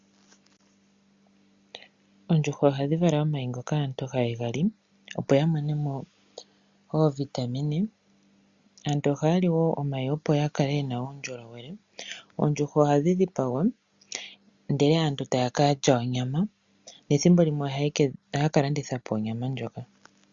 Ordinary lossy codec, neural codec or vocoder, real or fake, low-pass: Opus, 64 kbps; none; real; 7.2 kHz